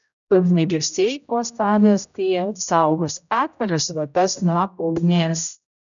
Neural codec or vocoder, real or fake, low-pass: codec, 16 kHz, 0.5 kbps, X-Codec, HuBERT features, trained on general audio; fake; 7.2 kHz